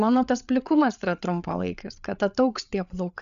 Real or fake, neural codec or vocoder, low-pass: fake; codec, 16 kHz, 8 kbps, FreqCodec, larger model; 7.2 kHz